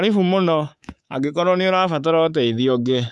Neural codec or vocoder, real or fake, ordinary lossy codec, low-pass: codec, 24 kHz, 3.1 kbps, DualCodec; fake; none; none